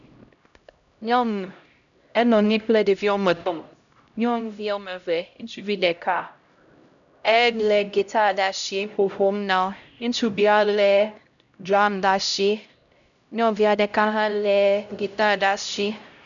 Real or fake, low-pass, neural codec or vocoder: fake; 7.2 kHz; codec, 16 kHz, 0.5 kbps, X-Codec, HuBERT features, trained on LibriSpeech